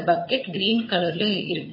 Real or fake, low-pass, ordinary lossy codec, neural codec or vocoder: fake; 5.4 kHz; MP3, 24 kbps; vocoder, 22.05 kHz, 80 mel bands, HiFi-GAN